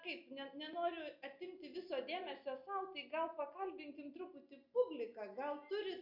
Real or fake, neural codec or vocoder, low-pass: real; none; 5.4 kHz